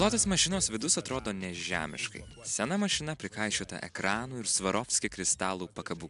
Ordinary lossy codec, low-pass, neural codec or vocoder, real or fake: AAC, 96 kbps; 10.8 kHz; none; real